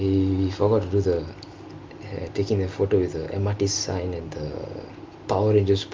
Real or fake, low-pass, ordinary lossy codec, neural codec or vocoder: real; 7.2 kHz; Opus, 32 kbps; none